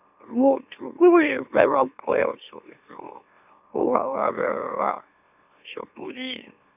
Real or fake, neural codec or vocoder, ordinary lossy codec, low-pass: fake; autoencoder, 44.1 kHz, a latent of 192 numbers a frame, MeloTTS; none; 3.6 kHz